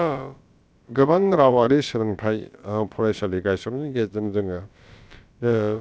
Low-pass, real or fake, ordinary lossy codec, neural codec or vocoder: none; fake; none; codec, 16 kHz, about 1 kbps, DyCAST, with the encoder's durations